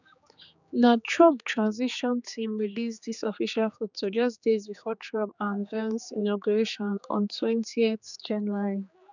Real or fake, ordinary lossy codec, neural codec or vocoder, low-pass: fake; none; codec, 16 kHz, 4 kbps, X-Codec, HuBERT features, trained on general audio; 7.2 kHz